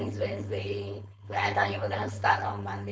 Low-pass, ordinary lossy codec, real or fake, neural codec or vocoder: none; none; fake; codec, 16 kHz, 4.8 kbps, FACodec